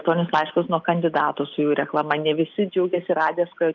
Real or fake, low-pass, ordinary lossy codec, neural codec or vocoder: real; 7.2 kHz; Opus, 24 kbps; none